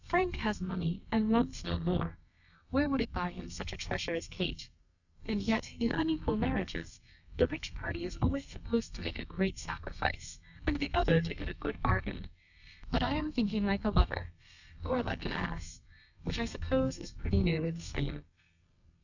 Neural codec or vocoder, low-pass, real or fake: codec, 32 kHz, 1.9 kbps, SNAC; 7.2 kHz; fake